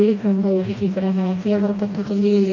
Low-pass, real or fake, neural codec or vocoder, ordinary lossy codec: 7.2 kHz; fake; codec, 16 kHz, 1 kbps, FreqCodec, smaller model; none